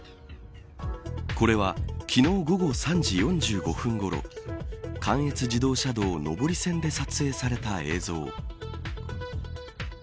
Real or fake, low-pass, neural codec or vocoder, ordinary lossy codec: real; none; none; none